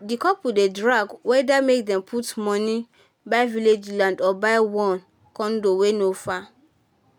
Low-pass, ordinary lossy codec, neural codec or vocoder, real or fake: 19.8 kHz; none; none; real